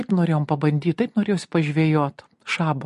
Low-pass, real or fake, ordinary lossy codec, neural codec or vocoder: 14.4 kHz; real; MP3, 48 kbps; none